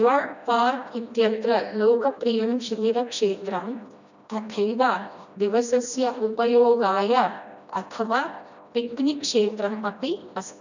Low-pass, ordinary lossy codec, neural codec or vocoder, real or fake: 7.2 kHz; none; codec, 16 kHz, 1 kbps, FreqCodec, smaller model; fake